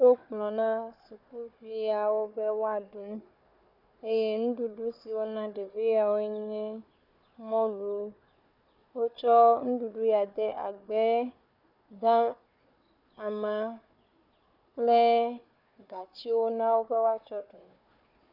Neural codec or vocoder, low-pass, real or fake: codec, 16 kHz, 4 kbps, FunCodec, trained on Chinese and English, 50 frames a second; 5.4 kHz; fake